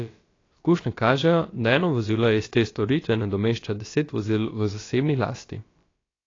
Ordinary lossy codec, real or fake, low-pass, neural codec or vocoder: AAC, 32 kbps; fake; 7.2 kHz; codec, 16 kHz, about 1 kbps, DyCAST, with the encoder's durations